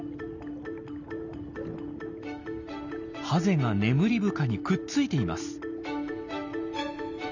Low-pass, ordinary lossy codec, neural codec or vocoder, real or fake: 7.2 kHz; none; none; real